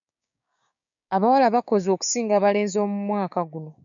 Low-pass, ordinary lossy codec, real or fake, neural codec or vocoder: 7.2 kHz; MP3, 64 kbps; fake; codec, 16 kHz, 6 kbps, DAC